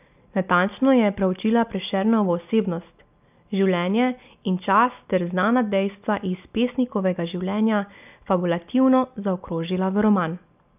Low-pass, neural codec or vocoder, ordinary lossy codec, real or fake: 3.6 kHz; none; none; real